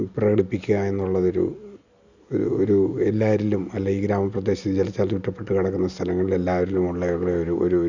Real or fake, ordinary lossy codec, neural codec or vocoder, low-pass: real; none; none; 7.2 kHz